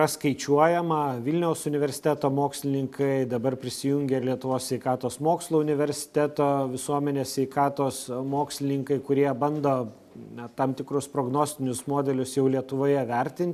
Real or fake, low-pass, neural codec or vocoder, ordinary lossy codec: real; 14.4 kHz; none; AAC, 96 kbps